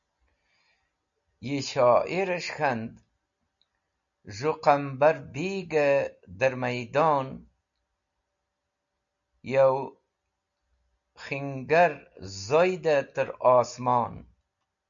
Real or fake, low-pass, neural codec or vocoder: real; 7.2 kHz; none